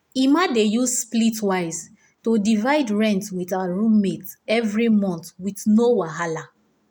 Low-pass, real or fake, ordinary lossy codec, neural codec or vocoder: none; real; none; none